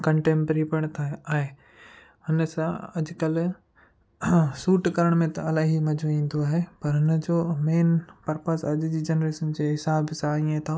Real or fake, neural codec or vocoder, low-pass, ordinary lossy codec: real; none; none; none